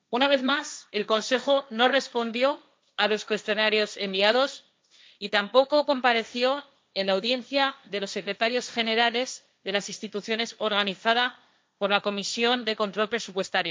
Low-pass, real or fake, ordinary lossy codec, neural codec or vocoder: none; fake; none; codec, 16 kHz, 1.1 kbps, Voila-Tokenizer